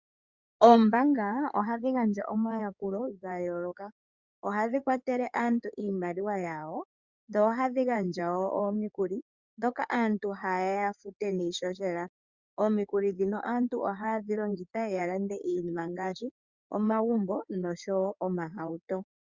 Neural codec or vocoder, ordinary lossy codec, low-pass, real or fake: codec, 16 kHz in and 24 kHz out, 2.2 kbps, FireRedTTS-2 codec; Opus, 64 kbps; 7.2 kHz; fake